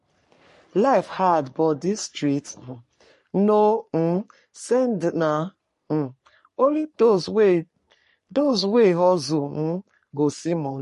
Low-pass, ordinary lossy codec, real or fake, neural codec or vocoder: 14.4 kHz; MP3, 48 kbps; fake; codec, 44.1 kHz, 3.4 kbps, Pupu-Codec